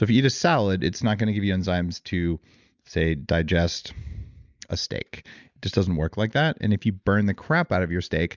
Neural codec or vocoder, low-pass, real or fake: none; 7.2 kHz; real